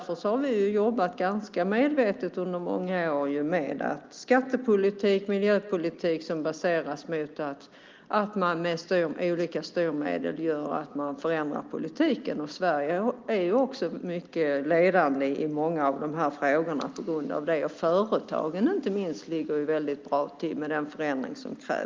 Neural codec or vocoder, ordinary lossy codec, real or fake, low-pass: none; Opus, 32 kbps; real; 7.2 kHz